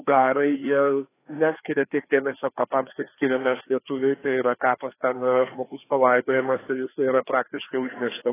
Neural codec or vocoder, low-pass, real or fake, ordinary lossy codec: codec, 16 kHz, 2 kbps, FreqCodec, larger model; 3.6 kHz; fake; AAC, 16 kbps